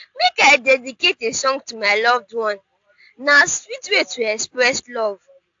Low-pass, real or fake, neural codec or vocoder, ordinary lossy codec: 7.2 kHz; real; none; AAC, 64 kbps